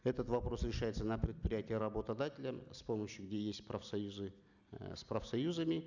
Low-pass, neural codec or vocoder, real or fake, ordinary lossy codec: 7.2 kHz; none; real; none